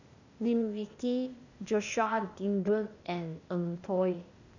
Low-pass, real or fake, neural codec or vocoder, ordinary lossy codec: 7.2 kHz; fake; codec, 16 kHz, 0.8 kbps, ZipCodec; none